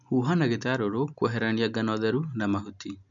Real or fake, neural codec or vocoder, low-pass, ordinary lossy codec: real; none; 7.2 kHz; none